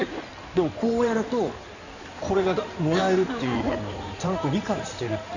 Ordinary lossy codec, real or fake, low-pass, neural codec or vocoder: none; fake; 7.2 kHz; codec, 16 kHz in and 24 kHz out, 2.2 kbps, FireRedTTS-2 codec